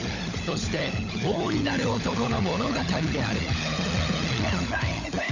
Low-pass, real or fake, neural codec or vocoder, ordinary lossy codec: 7.2 kHz; fake; codec, 16 kHz, 16 kbps, FunCodec, trained on LibriTTS, 50 frames a second; none